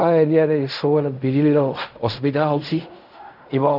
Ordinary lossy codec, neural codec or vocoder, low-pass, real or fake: none; codec, 16 kHz in and 24 kHz out, 0.4 kbps, LongCat-Audio-Codec, fine tuned four codebook decoder; 5.4 kHz; fake